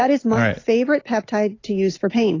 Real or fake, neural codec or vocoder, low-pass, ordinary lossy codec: real; none; 7.2 kHz; AAC, 32 kbps